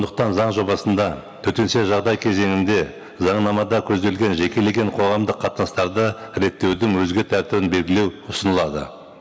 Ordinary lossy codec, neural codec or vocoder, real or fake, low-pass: none; none; real; none